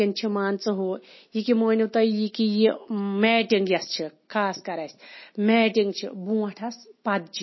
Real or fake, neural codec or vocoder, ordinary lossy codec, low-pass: real; none; MP3, 24 kbps; 7.2 kHz